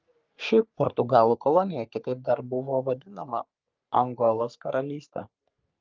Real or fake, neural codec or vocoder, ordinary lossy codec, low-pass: fake; codec, 44.1 kHz, 3.4 kbps, Pupu-Codec; Opus, 24 kbps; 7.2 kHz